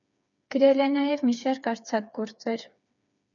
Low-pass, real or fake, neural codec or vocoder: 7.2 kHz; fake; codec, 16 kHz, 4 kbps, FreqCodec, smaller model